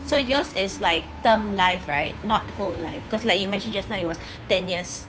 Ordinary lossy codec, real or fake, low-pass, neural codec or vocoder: none; fake; none; codec, 16 kHz, 2 kbps, FunCodec, trained on Chinese and English, 25 frames a second